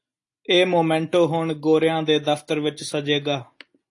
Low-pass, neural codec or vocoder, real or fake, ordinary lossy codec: 10.8 kHz; none; real; AAC, 48 kbps